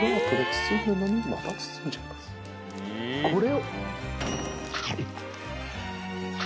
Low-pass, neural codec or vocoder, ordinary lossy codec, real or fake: none; none; none; real